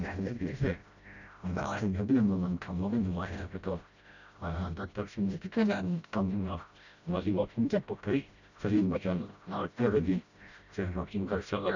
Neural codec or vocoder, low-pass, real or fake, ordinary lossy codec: codec, 16 kHz, 0.5 kbps, FreqCodec, smaller model; 7.2 kHz; fake; none